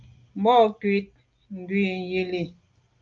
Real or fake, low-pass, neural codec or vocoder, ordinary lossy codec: real; 7.2 kHz; none; Opus, 32 kbps